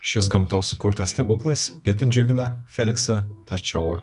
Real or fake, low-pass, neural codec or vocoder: fake; 10.8 kHz; codec, 24 kHz, 0.9 kbps, WavTokenizer, medium music audio release